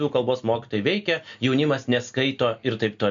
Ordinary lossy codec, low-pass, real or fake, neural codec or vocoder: MP3, 64 kbps; 7.2 kHz; real; none